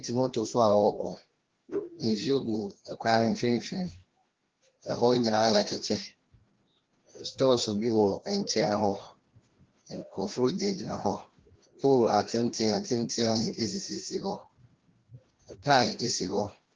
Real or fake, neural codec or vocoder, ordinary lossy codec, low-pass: fake; codec, 16 kHz, 1 kbps, FreqCodec, larger model; Opus, 16 kbps; 7.2 kHz